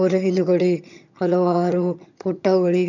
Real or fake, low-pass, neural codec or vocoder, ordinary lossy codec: fake; 7.2 kHz; vocoder, 22.05 kHz, 80 mel bands, HiFi-GAN; none